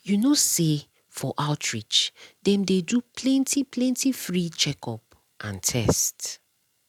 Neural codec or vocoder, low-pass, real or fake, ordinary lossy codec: none; 19.8 kHz; real; none